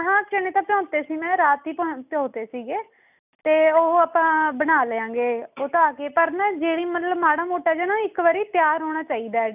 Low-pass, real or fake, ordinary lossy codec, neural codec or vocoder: 3.6 kHz; real; none; none